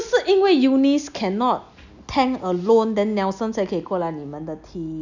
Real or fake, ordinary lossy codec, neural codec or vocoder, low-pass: real; none; none; 7.2 kHz